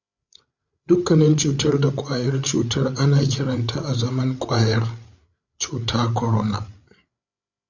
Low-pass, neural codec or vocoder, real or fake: 7.2 kHz; codec, 16 kHz, 8 kbps, FreqCodec, larger model; fake